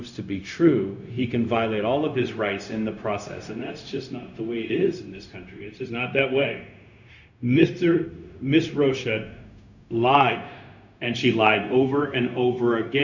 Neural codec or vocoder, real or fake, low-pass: codec, 16 kHz, 0.4 kbps, LongCat-Audio-Codec; fake; 7.2 kHz